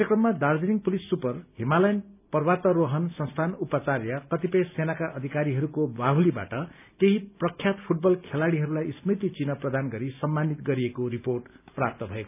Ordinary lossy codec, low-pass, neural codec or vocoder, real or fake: none; 3.6 kHz; none; real